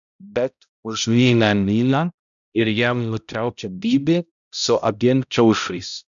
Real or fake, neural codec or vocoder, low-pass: fake; codec, 16 kHz, 0.5 kbps, X-Codec, HuBERT features, trained on balanced general audio; 7.2 kHz